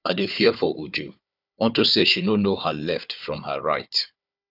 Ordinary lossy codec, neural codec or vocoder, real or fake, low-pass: none; codec, 16 kHz, 4 kbps, FunCodec, trained on Chinese and English, 50 frames a second; fake; 5.4 kHz